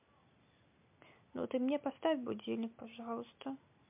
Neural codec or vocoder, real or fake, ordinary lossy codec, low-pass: none; real; MP3, 32 kbps; 3.6 kHz